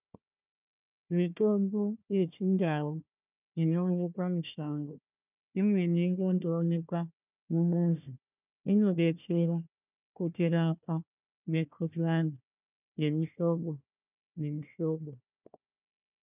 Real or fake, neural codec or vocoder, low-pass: fake; codec, 16 kHz, 1 kbps, FunCodec, trained on Chinese and English, 50 frames a second; 3.6 kHz